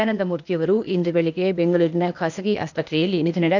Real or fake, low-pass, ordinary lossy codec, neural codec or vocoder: fake; 7.2 kHz; none; codec, 16 kHz, 0.8 kbps, ZipCodec